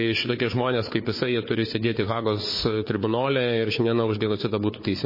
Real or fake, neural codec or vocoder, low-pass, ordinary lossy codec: fake; codec, 16 kHz, 4 kbps, FunCodec, trained on Chinese and English, 50 frames a second; 5.4 kHz; MP3, 24 kbps